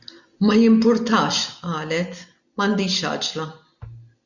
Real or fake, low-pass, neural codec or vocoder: real; 7.2 kHz; none